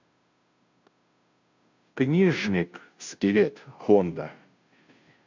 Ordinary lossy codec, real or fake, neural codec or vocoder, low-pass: MP3, 64 kbps; fake; codec, 16 kHz, 0.5 kbps, FunCodec, trained on Chinese and English, 25 frames a second; 7.2 kHz